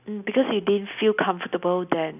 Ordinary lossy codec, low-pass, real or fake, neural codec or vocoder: none; 3.6 kHz; real; none